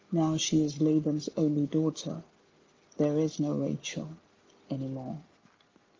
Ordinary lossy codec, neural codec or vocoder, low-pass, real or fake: Opus, 32 kbps; none; 7.2 kHz; real